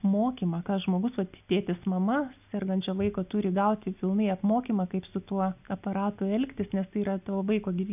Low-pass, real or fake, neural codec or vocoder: 3.6 kHz; real; none